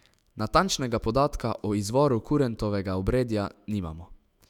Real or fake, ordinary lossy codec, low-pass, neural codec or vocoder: fake; none; 19.8 kHz; autoencoder, 48 kHz, 128 numbers a frame, DAC-VAE, trained on Japanese speech